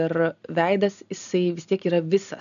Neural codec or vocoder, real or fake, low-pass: none; real; 7.2 kHz